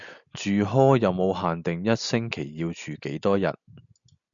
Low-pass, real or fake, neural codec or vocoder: 7.2 kHz; real; none